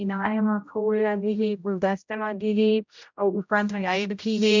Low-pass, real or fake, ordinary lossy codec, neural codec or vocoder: 7.2 kHz; fake; MP3, 64 kbps; codec, 16 kHz, 0.5 kbps, X-Codec, HuBERT features, trained on general audio